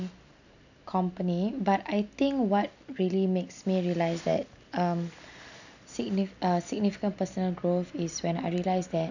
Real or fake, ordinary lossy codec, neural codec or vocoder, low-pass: real; none; none; 7.2 kHz